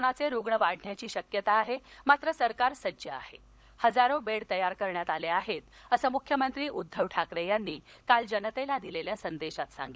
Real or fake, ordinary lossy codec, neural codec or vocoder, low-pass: fake; none; codec, 16 kHz, 16 kbps, FunCodec, trained on LibriTTS, 50 frames a second; none